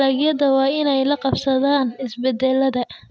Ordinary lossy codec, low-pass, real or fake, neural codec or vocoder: none; none; real; none